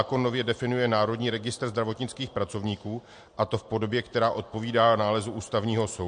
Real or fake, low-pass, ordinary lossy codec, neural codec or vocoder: real; 9.9 kHz; MP3, 48 kbps; none